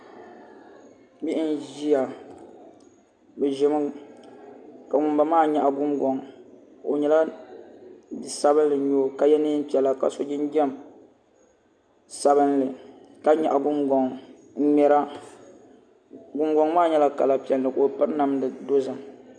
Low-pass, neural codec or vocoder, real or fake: 9.9 kHz; none; real